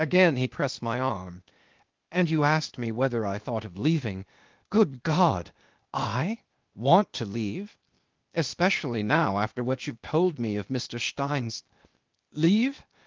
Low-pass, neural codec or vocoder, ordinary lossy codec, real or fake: 7.2 kHz; codec, 16 kHz, 0.8 kbps, ZipCodec; Opus, 32 kbps; fake